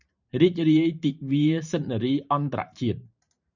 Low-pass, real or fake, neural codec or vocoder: 7.2 kHz; fake; vocoder, 44.1 kHz, 128 mel bands every 512 samples, BigVGAN v2